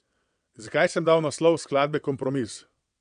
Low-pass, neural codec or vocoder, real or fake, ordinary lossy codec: 9.9 kHz; vocoder, 22.05 kHz, 80 mel bands, WaveNeXt; fake; none